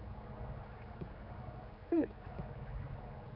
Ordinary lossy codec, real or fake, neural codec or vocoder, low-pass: AAC, 32 kbps; fake; codec, 16 kHz, 4 kbps, X-Codec, HuBERT features, trained on general audio; 5.4 kHz